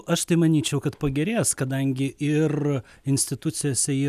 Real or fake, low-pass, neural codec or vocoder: real; 14.4 kHz; none